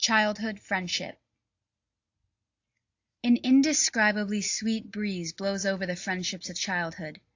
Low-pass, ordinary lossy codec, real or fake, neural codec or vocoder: 7.2 kHz; AAC, 48 kbps; real; none